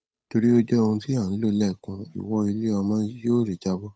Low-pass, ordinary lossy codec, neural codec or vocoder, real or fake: none; none; codec, 16 kHz, 8 kbps, FunCodec, trained on Chinese and English, 25 frames a second; fake